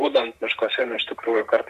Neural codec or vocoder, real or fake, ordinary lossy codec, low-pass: vocoder, 44.1 kHz, 128 mel bands, Pupu-Vocoder; fake; MP3, 96 kbps; 14.4 kHz